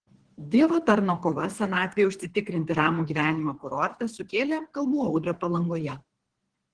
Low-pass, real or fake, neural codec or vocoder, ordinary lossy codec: 9.9 kHz; fake; codec, 24 kHz, 3 kbps, HILCodec; Opus, 16 kbps